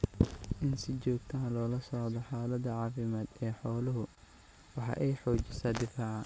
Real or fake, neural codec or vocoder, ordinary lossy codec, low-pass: real; none; none; none